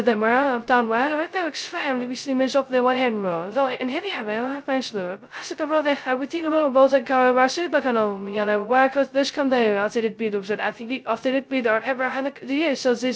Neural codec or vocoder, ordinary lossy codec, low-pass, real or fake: codec, 16 kHz, 0.2 kbps, FocalCodec; none; none; fake